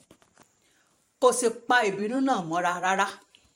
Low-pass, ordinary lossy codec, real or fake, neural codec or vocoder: 19.8 kHz; MP3, 64 kbps; fake; vocoder, 44.1 kHz, 128 mel bands every 512 samples, BigVGAN v2